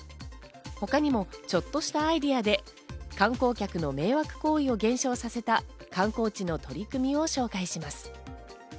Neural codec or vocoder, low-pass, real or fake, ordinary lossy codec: none; none; real; none